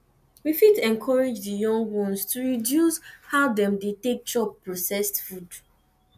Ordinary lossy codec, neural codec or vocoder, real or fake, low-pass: none; none; real; 14.4 kHz